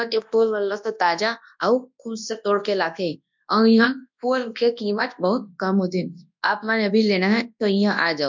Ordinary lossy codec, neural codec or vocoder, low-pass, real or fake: MP3, 64 kbps; codec, 24 kHz, 0.9 kbps, WavTokenizer, large speech release; 7.2 kHz; fake